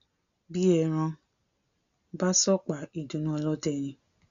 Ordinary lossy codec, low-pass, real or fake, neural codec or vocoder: none; 7.2 kHz; real; none